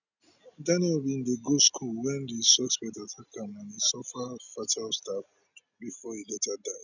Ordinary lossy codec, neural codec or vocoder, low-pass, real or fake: none; none; 7.2 kHz; real